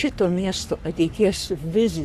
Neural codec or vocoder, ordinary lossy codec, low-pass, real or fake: codec, 44.1 kHz, 3.4 kbps, Pupu-Codec; Opus, 64 kbps; 14.4 kHz; fake